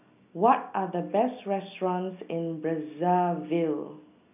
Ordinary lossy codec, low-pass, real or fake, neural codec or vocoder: none; 3.6 kHz; real; none